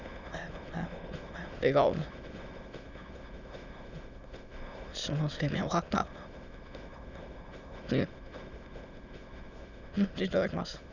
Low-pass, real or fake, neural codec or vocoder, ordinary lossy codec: 7.2 kHz; fake; autoencoder, 22.05 kHz, a latent of 192 numbers a frame, VITS, trained on many speakers; none